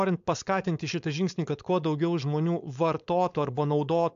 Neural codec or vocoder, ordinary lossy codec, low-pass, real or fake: codec, 16 kHz, 4.8 kbps, FACodec; AAC, 64 kbps; 7.2 kHz; fake